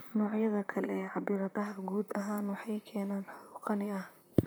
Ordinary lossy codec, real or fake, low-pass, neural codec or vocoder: none; fake; none; vocoder, 44.1 kHz, 128 mel bands, Pupu-Vocoder